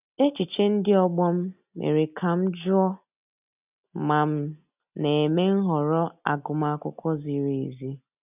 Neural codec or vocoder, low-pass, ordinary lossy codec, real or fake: none; 3.6 kHz; none; real